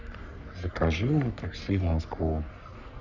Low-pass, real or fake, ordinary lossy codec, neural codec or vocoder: 7.2 kHz; fake; none; codec, 44.1 kHz, 3.4 kbps, Pupu-Codec